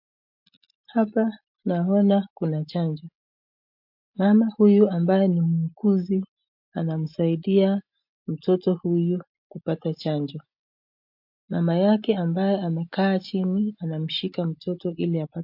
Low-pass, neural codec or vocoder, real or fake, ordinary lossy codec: 5.4 kHz; none; real; MP3, 48 kbps